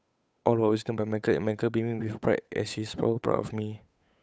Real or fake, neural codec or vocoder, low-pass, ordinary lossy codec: fake; codec, 16 kHz, 8 kbps, FunCodec, trained on Chinese and English, 25 frames a second; none; none